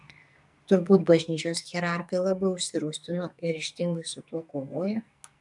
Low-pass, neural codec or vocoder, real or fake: 10.8 kHz; codec, 44.1 kHz, 2.6 kbps, SNAC; fake